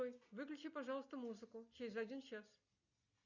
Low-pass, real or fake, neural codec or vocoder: 7.2 kHz; real; none